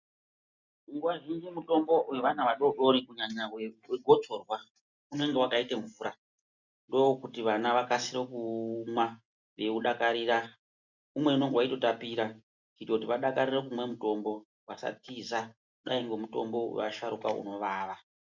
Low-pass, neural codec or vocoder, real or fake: 7.2 kHz; none; real